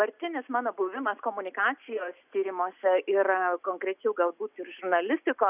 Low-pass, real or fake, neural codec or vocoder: 3.6 kHz; real; none